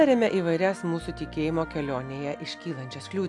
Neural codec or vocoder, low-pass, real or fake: none; 10.8 kHz; real